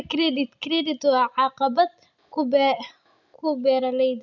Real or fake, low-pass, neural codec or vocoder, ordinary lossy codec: real; 7.2 kHz; none; none